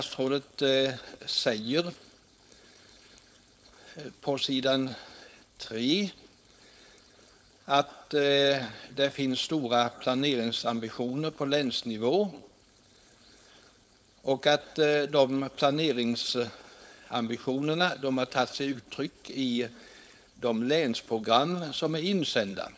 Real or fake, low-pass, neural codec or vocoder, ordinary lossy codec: fake; none; codec, 16 kHz, 4.8 kbps, FACodec; none